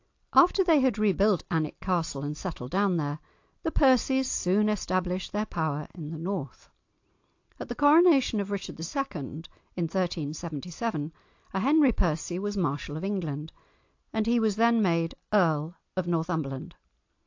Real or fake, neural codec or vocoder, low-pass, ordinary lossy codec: real; none; 7.2 kHz; AAC, 48 kbps